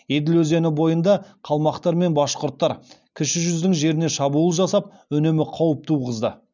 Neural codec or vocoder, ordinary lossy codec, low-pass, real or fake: none; none; 7.2 kHz; real